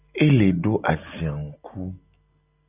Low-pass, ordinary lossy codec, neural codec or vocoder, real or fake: 3.6 kHz; AAC, 32 kbps; none; real